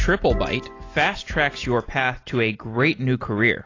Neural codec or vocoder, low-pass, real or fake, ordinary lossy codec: none; 7.2 kHz; real; AAC, 32 kbps